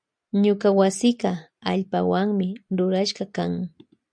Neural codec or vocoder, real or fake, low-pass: none; real; 9.9 kHz